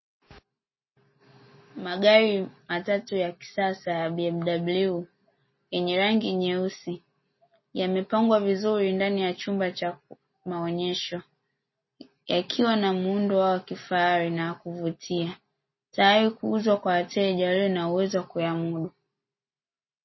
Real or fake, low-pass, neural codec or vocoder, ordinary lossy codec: real; 7.2 kHz; none; MP3, 24 kbps